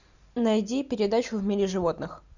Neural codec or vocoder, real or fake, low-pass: none; real; 7.2 kHz